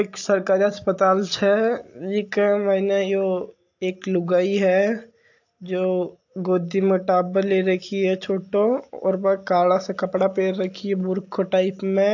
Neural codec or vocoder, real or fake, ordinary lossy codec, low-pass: none; real; AAC, 48 kbps; 7.2 kHz